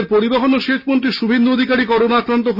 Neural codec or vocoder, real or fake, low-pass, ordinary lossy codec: none; real; 5.4 kHz; Opus, 64 kbps